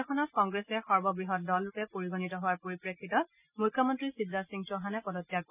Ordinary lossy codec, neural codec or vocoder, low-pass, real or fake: none; none; 3.6 kHz; real